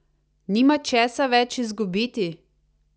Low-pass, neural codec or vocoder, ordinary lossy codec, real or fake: none; none; none; real